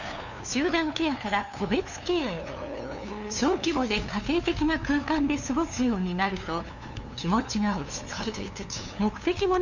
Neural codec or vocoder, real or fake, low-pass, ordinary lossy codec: codec, 16 kHz, 2 kbps, FunCodec, trained on LibriTTS, 25 frames a second; fake; 7.2 kHz; none